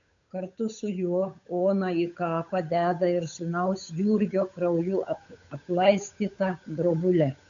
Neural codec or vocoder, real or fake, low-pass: codec, 16 kHz, 8 kbps, FunCodec, trained on Chinese and English, 25 frames a second; fake; 7.2 kHz